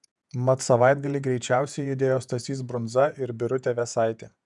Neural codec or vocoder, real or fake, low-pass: vocoder, 48 kHz, 128 mel bands, Vocos; fake; 10.8 kHz